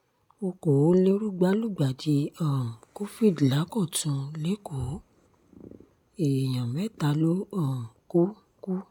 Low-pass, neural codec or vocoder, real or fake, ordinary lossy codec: 19.8 kHz; none; real; none